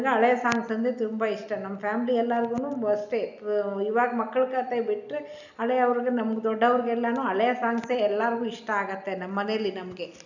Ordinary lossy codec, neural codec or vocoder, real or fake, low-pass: none; none; real; 7.2 kHz